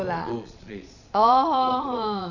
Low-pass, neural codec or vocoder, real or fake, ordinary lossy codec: 7.2 kHz; vocoder, 22.05 kHz, 80 mel bands, Vocos; fake; none